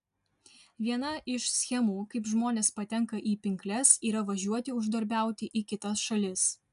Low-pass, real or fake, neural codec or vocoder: 10.8 kHz; real; none